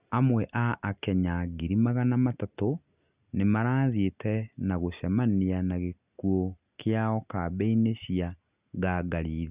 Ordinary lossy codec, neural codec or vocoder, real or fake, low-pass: Opus, 64 kbps; none; real; 3.6 kHz